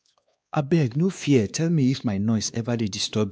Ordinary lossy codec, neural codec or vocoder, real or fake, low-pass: none; codec, 16 kHz, 2 kbps, X-Codec, WavLM features, trained on Multilingual LibriSpeech; fake; none